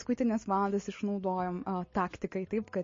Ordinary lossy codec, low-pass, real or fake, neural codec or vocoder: MP3, 32 kbps; 7.2 kHz; real; none